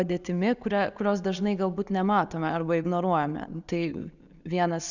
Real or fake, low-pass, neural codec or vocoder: real; 7.2 kHz; none